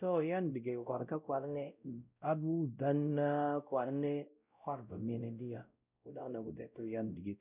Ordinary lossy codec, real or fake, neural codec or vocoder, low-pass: none; fake; codec, 16 kHz, 0.5 kbps, X-Codec, WavLM features, trained on Multilingual LibriSpeech; 3.6 kHz